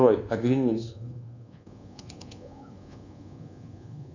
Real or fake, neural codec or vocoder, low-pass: fake; codec, 24 kHz, 1.2 kbps, DualCodec; 7.2 kHz